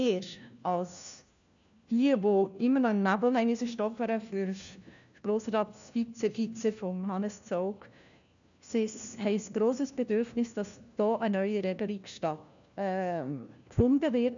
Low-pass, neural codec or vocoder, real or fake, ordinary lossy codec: 7.2 kHz; codec, 16 kHz, 1 kbps, FunCodec, trained on LibriTTS, 50 frames a second; fake; none